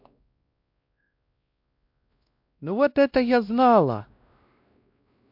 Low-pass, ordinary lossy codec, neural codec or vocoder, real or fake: 5.4 kHz; MP3, 48 kbps; codec, 16 kHz, 1 kbps, X-Codec, WavLM features, trained on Multilingual LibriSpeech; fake